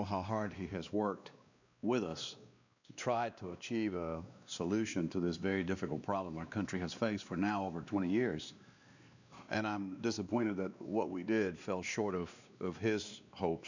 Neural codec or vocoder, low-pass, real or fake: codec, 16 kHz, 2 kbps, X-Codec, WavLM features, trained on Multilingual LibriSpeech; 7.2 kHz; fake